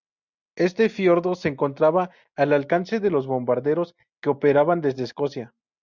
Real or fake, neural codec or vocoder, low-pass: real; none; 7.2 kHz